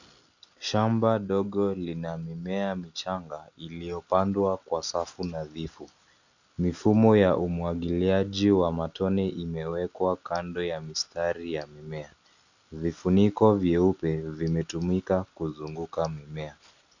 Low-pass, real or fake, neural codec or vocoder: 7.2 kHz; real; none